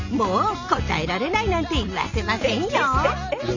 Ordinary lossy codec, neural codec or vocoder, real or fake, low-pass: none; none; real; 7.2 kHz